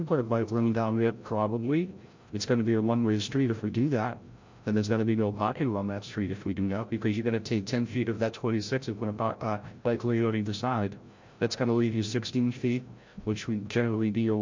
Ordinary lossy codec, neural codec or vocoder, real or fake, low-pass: MP3, 48 kbps; codec, 16 kHz, 0.5 kbps, FreqCodec, larger model; fake; 7.2 kHz